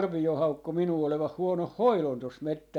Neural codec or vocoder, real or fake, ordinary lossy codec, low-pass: none; real; none; 19.8 kHz